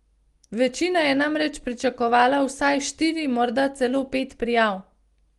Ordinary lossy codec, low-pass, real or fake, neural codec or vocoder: Opus, 24 kbps; 10.8 kHz; real; none